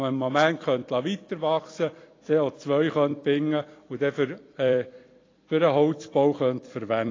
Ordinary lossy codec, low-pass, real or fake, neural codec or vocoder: AAC, 32 kbps; 7.2 kHz; fake; vocoder, 44.1 kHz, 128 mel bands every 256 samples, BigVGAN v2